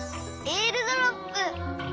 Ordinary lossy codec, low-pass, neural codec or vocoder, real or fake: none; none; none; real